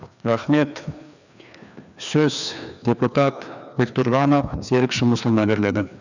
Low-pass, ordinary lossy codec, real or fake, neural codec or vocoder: 7.2 kHz; none; fake; codec, 16 kHz, 2 kbps, FreqCodec, larger model